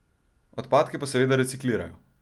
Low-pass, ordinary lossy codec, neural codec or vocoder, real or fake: 19.8 kHz; Opus, 32 kbps; none; real